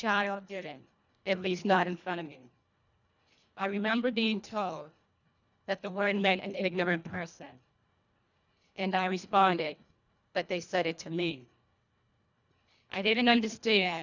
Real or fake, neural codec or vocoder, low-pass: fake; codec, 24 kHz, 1.5 kbps, HILCodec; 7.2 kHz